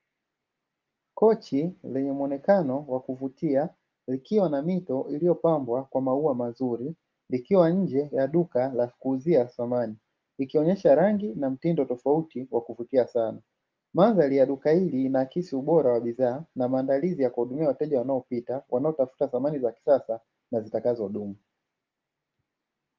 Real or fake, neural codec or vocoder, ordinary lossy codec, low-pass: real; none; Opus, 24 kbps; 7.2 kHz